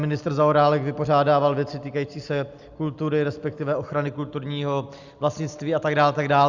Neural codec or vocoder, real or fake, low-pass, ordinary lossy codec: none; real; 7.2 kHz; Opus, 64 kbps